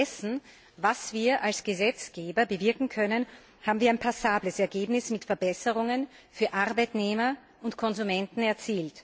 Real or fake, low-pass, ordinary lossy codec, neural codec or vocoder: real; none; none; none